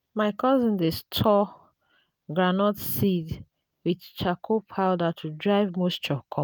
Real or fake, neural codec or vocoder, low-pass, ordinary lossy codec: real; none; none; none